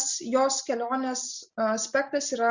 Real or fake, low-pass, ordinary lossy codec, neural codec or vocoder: real; 7.2 kHz; Opus, 64 kbps; none